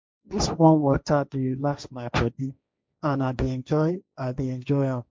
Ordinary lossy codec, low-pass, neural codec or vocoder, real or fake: none; none; codec, 16 kHz, 1.1 kbps, Voila-Tokenizer; fake